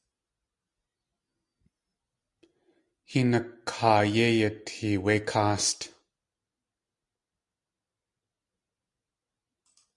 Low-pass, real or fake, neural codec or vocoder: 10.8 kHz; real; none